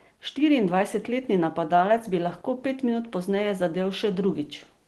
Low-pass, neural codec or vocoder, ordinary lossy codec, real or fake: 10.8 kHz; none; Opus, 16 kbps; real